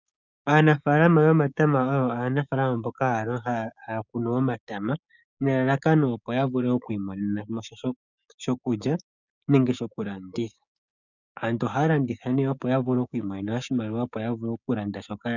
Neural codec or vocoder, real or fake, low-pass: codec, 16 kHz, 6 kbps, DAC; fake; 7.2 kHz